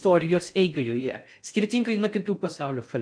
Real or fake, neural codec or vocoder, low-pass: fake; codec, 16 kHz in and 24 kHz out, 0.6 kbps, FocalCodec, streaming, 4096 codes; 9.9 kHz